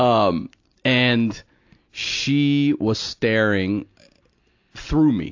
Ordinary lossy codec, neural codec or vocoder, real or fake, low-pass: AAC, 48 kbps; none; real; 7.2 kHz